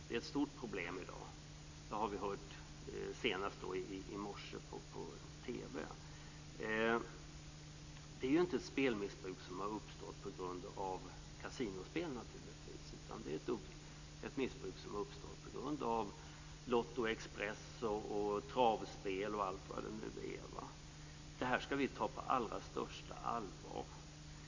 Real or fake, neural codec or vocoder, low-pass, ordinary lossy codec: real; none; 7.2 kHz; AAC, 48 kbps